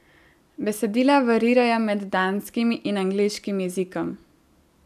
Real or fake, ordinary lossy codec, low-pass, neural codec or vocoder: real; none; 14.4 kHz; none